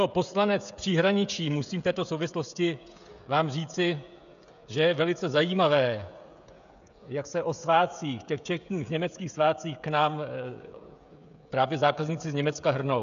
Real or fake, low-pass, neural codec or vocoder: fake; 7.2 kHz; codec, 16 kHz, 16 kbps, FreqCodec, smaller model